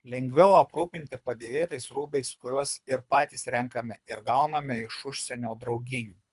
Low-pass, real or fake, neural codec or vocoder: 10.8 kHz; fake; codec, 24 kHz, 3 kbps, HILCodec